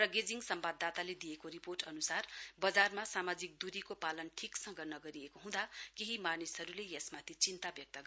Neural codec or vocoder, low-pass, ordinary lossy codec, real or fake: none; none; none; real